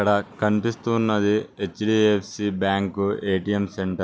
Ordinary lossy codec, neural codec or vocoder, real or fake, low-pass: none; none; real; none